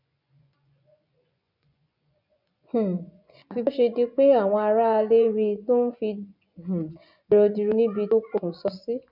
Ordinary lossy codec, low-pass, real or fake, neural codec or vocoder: none; 5.4 kHz; fake; vocoder, 24 kHz, 100 mel bands, Vocos